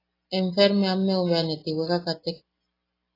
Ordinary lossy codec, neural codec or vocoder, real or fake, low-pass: AAC, 24 kbps; none; real; 5.4 kHz